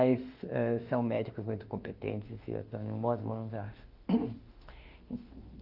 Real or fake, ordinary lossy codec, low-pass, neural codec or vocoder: fake; Opus, 32 kbps; 5.4 kHz; autoencoder, 48 kHz, 32 numbers a frame, DAC-VAE, trained on Japanese speech